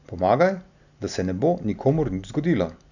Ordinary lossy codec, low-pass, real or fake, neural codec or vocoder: none; 7.2 kHz; real; none